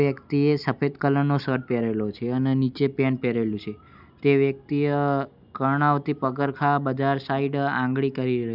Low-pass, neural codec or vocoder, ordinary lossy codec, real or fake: 5.4 kHz; none; none; real